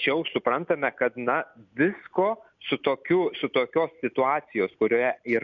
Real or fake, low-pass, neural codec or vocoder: real; 7.2 kHz; none